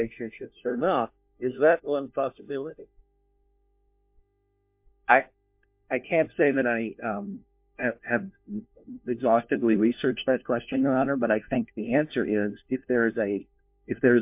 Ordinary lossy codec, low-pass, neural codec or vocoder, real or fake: MP3, 32 kbps; 3.6 kHz; codec, 16 kHz, 1 kbps, FunCodec, trained on LibriTTS, 50 frames a second; fake